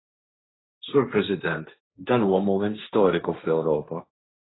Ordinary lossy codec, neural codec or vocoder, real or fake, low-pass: AAC, 16 kbps; codec, 16 kHz, 1.1 kbps, Voila-Tokenizer; fake; 7.2 kHz